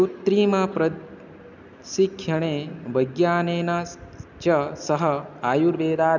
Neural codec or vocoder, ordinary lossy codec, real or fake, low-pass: none; none; real; 7.2 kHz